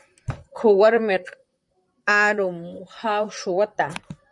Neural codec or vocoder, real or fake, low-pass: vocoder, 44.1 kHz, 128 mel bands, Pupu-Vocoder; fake; 10.8 kHz